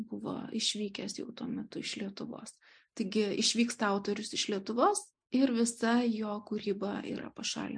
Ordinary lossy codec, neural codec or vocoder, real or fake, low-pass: MP3, 64 kbps; none; real; 9.9 kHz